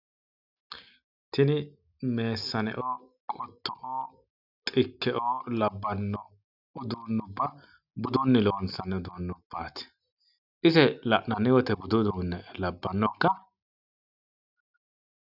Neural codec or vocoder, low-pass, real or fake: none; 5.4 kHz; real